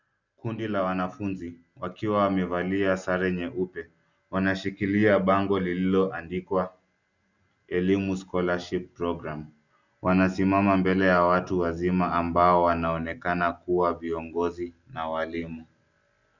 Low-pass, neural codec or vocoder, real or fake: 7.2 kHz; none; real